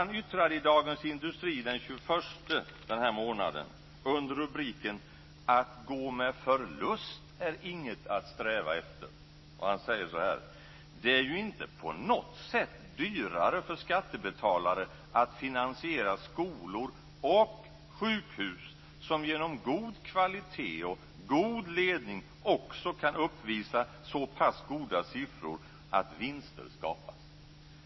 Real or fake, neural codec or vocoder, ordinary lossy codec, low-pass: real; none; MP3, 24 kbps; 7.2 kHz